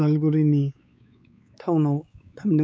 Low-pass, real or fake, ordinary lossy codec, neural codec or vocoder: none; fake; none; codec, 16 kHz, 4 kbps, X-Codec, WavLM features, trained on Multilingual LibriSpeech